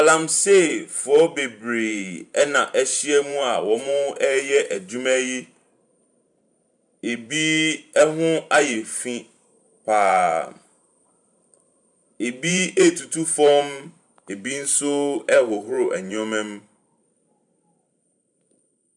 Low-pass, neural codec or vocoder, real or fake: 10.8 kHz; vocoder, 44.1 kHz, 128 mel bands every 512 samples, BigVGAN v2; fake